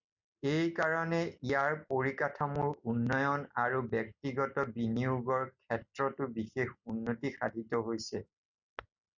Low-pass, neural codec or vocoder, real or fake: 7.2 kHz; none; real